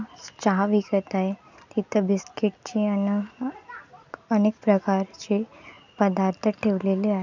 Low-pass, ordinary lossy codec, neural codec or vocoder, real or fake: 7.2 kHz; none; none; real